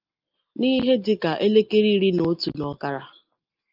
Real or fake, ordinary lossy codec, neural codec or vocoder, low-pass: real; Opus, 24 kbps; none; 5.4 kHz